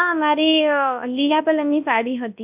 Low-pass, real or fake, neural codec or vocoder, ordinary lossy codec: 3.6 kHz; fake; codec, 24 kHz, 0.9 kbps, WavTokenizer, large speech release; AAC, 32 kbps